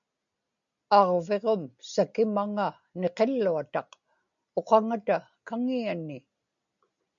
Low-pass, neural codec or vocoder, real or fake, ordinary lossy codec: 7.2 kHz; none; real; MP3, 64 kbps